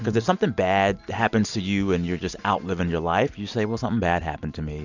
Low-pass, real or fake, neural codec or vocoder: 7.2 kHz; real; none